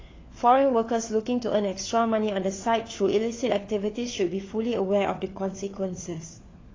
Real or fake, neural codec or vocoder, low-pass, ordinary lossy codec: fake; codec, 16 kHz, 4 kbps, FunCodec, trained on LibriTTS, 50 frames a second; 7.2 kHz; AAC, 32 kbps